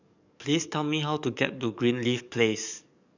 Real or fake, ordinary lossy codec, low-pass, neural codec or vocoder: fake; none; 7.2 kHz; codec, 44.1 kHz, 7.8 kbps, DAC